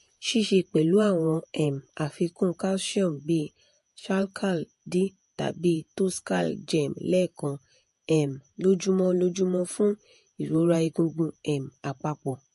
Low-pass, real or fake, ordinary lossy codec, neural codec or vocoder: 14.4 kHz; fake; MP3, 48 kbps; vocoder, 48 kHz, 128 mel bands, Vocos